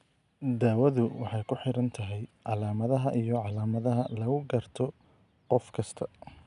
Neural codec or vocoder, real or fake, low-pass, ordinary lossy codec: none; real; 10.8 kHz; none